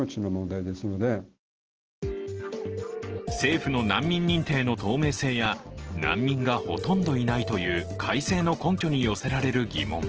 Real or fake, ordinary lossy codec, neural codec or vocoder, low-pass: real; Opus, 16 kbps; none; 7.2 kHz